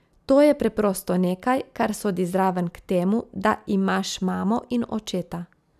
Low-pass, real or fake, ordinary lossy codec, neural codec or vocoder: 14.4 kHz; real; none; none